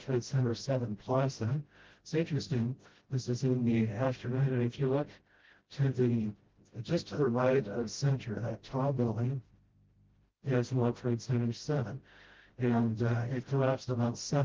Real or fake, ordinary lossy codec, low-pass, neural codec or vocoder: fake; Opus, 16 kbps; 7.2 kHz; codec, 16 kHz, 0.5 kbps, FreqCodec, smaller model